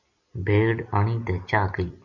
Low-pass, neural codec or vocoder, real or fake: 7.2 kHz; none; real